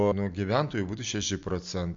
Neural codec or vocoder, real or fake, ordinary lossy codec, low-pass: none; real; MP3, 48 kbps; 7.2 kHz